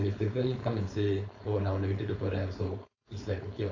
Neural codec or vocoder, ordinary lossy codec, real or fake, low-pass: codec, 16 kHz, 4.8 kbps, FACodec; AAC, 48 kbps; fake; 7.2 kHz